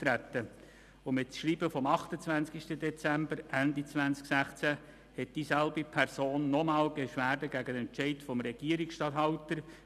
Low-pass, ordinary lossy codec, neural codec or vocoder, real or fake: 14.4 kHz; none; none; real